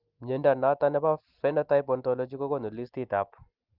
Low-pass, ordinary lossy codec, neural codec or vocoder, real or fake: 5.4 kHz; Opus, 24 kbps; none; real